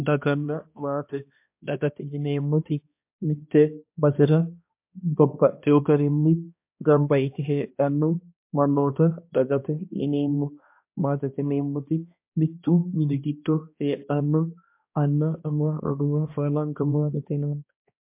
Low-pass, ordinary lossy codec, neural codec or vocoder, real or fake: 3.6 kHz; MP3, 32 kbps; codec, 16 kHz, 1 kbps, X-Codec, HuBERT features, trained on balanced general audio; fake